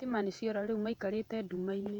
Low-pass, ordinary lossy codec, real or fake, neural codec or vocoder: 19.8 kHz; none; fake; vocoder, 48 kHz, 128 mel bands, Vocos